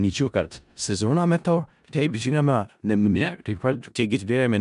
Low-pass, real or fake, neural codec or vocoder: 10.8 kHz; fake; codec, 16 kHz in and 24 kHz out, 0.4 kbps, LongCat-Audio-Codec, four codebook decoder